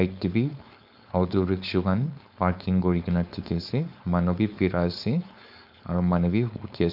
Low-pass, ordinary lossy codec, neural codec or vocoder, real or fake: 5.4 kHz; none; codec, 16 kHz, 4.8 kbps, FACodec; fake